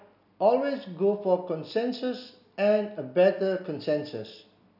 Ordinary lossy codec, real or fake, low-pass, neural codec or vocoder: none; real; 5.4 kHz; none